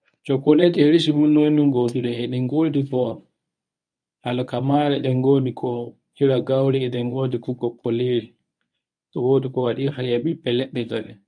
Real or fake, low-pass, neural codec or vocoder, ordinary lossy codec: fake; 9.9 kHz; codec, 24 kHz, 0.9 kbps, WavTokenizer, medium speech release version 1; none